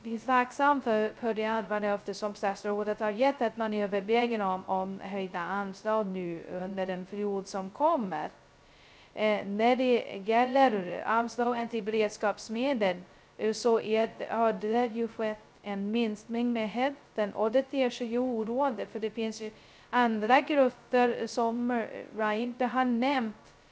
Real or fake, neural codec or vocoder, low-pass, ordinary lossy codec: fake; codec, 16 kHz, 0.2 kbps, FocalCodec; none; none